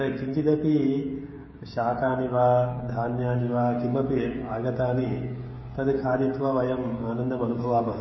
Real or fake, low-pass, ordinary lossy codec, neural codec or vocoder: fake; 7.2 kHz; MP3, 24 kbps; codec, 16 kHz, 16 kbps, FreqCodec, smaller model